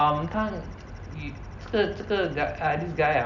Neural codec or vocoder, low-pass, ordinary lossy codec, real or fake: none; 7.2 kHz; none; real